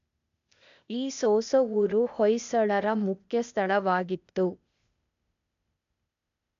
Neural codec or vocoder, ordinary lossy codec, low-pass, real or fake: codec, 16 kHz, 0.8 kbps, ZipCodec; none; 7.2 kHz; fake